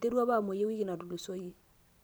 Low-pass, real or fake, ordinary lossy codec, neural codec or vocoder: none; fake; none; vocoder, 44.1 kHz, 128 mel bands every 256 samples, BigVGAN v2